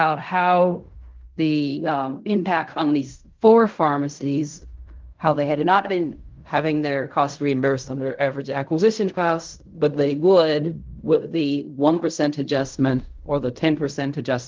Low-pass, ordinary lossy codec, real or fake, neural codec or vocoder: 7.2 kHz; Opus, 32 kbps; fake; codec, 16 kHz in and 24 kHz out, 0.4 kbps, LongCat-Audio-Codec, fine tuned four codebook decoder